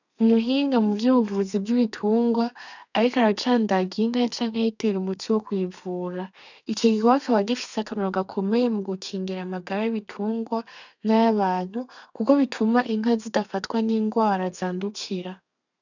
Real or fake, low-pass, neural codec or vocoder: fake; 7.2 kHz; codec, 32 kHz, 1.9 kbps, SNAC